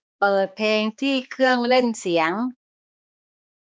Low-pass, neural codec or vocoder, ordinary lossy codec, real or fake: none; codec, 16 kHz, 2 kbps, X-Codec, HuBERT features, trained on balanced general audio; none; fake